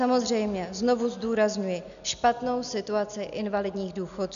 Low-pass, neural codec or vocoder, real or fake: 7.2 kHz; none; real